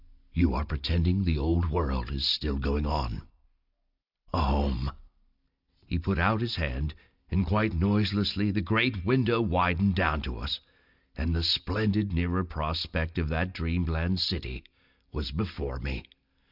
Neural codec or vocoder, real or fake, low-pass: none; real; 5.4 kHz